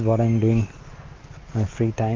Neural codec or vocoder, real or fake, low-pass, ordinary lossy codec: none; real; 7.2 kHz; Opus, 32 kbps